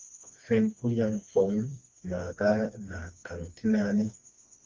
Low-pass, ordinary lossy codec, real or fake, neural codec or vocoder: 7.2 kHz; Opus, 24 kbps; fake; codec, 16 kHz, 2 kbps, FreqCodec, smaller model